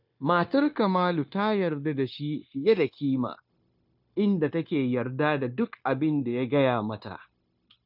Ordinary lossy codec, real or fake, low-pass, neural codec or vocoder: none; fake; 5.4 kHz; codec, 16 kHz, 0.9 kbps, LongCat-Audio-Codec